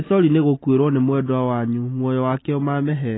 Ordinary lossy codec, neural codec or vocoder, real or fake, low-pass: AAC, 16 kbps; none; real; 7.2 kHz